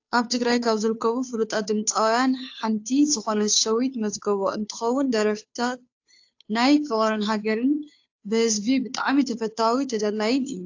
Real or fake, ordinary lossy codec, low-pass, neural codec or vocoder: fake; AAC, 48 kbps; 7.2 kHz; codec, 16 kHz, 2 kbps, FunCodec, trained on Chinese and English, 25 frames a second